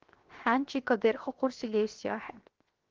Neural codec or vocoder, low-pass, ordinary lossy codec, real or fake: codec, 16 kHz, 0.7 kbps, FocalCodec; 7.2 kHz; Opus, 16 kbps; fake